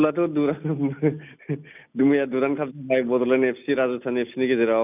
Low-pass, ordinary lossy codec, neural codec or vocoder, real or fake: 3.6 kHz; none; none; real